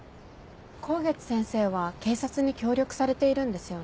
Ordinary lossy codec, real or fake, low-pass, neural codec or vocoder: none; real; none; none